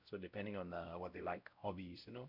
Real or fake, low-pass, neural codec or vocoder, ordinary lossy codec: fake; 5.4 kHz; codec, 16 kHz, 0.5 kbps, X-Codec, WavLM features, trained on Multilingual LibriSpeech; none